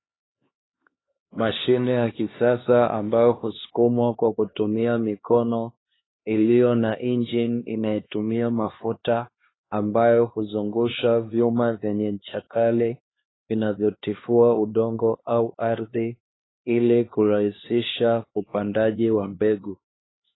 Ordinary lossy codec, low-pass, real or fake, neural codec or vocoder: AAC, 16 kbps; 7.2 kHz; fake; codec, 16 kHz, 2 kbps, X-Codec, HuBERT features, trained on LibriSpeech